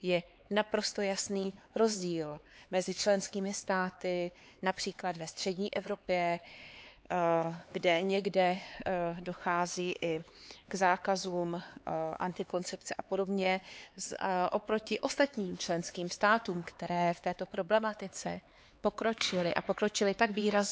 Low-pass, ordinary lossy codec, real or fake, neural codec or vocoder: none; none; fake; codec, 16 kHz, 4 kbps, X-Codec, HuBERT features, trained on LibriSpeech